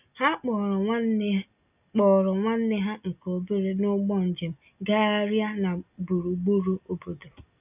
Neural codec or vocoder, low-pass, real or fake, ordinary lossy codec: none; 3.6 kHz; real; none